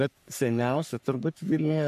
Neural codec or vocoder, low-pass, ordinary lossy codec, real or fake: codec, 44.1 kHz, 3.4 kbps, Pupu-Codec; 14.4 kHz; AAC, 96 kbps; fake